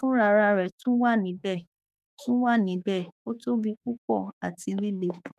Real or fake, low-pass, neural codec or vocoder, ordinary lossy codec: fake; 14.4 kHz; autoencoder, 48 kHz, 32 numbers a frame, DAC-VAE, trained on Japanese speech; none